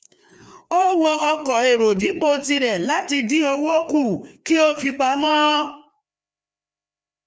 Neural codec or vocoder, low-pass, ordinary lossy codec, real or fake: codec, 16 kHz, 2 kbps, FreqCodec, larger model; none; none; fake